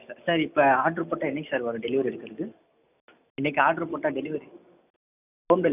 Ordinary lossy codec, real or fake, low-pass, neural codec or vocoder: none; real; 3.6 kHz; none